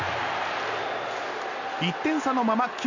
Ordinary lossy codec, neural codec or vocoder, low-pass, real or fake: none; none; 7.2 kHz; real